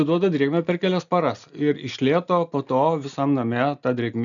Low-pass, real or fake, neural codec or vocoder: 7.2 kHz; real; none